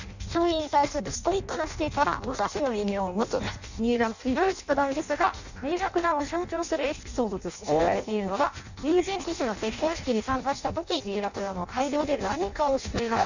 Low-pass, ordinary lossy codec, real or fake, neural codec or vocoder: 7.2 kHz; none; fake; codec, 16 kHz in and 24 kHz out, 0.6 kbps, FireRedTTS-2 codec